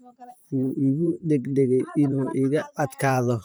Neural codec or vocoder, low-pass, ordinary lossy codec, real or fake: vocoder, 44.1 kHz, 128 mel bands, Pupu-Vocoder; none; none; fake